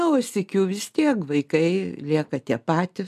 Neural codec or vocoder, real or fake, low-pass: none; real; 14.4 kHz